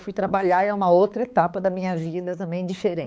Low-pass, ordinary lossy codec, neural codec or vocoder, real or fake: none; none; codec, 16 kHz, 4 kbps, X-Codec, HuBERT features, trained on balanced general audio; fake